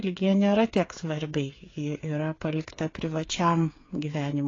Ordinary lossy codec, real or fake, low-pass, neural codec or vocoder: AAC, 32 kbps; fake; 7.2 kHz; codec, 16 kHz, 8 kbps, FreqCodec, smaller model